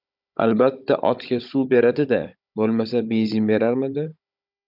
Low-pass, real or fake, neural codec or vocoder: 5.4 kHz; fake; codec, 16 kHz, 16 kbps, FunCodec, trained on Chinese and English, 50 frames a second